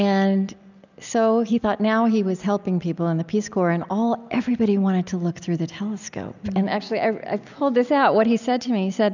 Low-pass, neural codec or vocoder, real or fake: 7.2 kHz; none; real